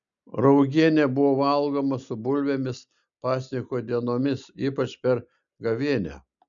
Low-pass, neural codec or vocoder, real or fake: 7.2 kHz; none; real